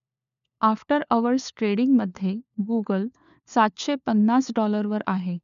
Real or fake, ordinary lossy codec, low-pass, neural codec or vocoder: fake; none; 7.2 kHz; codec, 16 kHz, 4 kbps, FunCodec, trained on LibriTTS, 50 frames a second